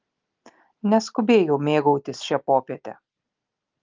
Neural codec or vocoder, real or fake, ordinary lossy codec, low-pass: none; real; Opus, 24 kbps; 7.2 kHz